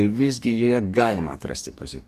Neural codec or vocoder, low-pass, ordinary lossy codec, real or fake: codec, 44.1 kHz, 2.6 kbps, DAC; 14.4 kHz; Opus, 64 kbps; fake